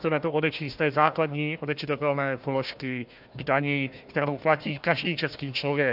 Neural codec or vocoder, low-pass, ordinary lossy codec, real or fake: codec, 16 kHz, 1 kbps, FunCodec, trained on Chinese and English, 50 frames a second; 5.4 kHz; AAC, 48 kbps; fake